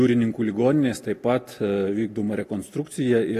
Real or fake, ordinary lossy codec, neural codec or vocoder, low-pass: real; AAC, 48 kbps; none; 14.4 kHz